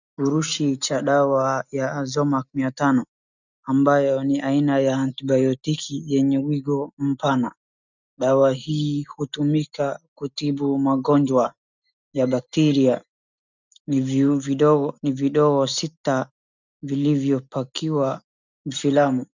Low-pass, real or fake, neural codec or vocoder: 7.2 kHz; real; none